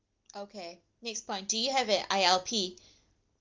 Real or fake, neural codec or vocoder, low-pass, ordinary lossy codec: real; none; 7.2 kHz; Opus, 32 kbps